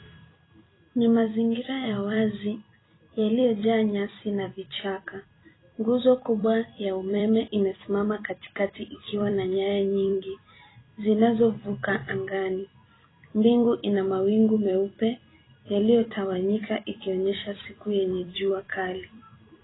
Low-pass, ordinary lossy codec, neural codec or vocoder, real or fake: 7.2 kHz; AAC, 16 kbps; vocoder, 44.1 kHz, 128 mel bands every 256 samples, BigVGAN v2; fake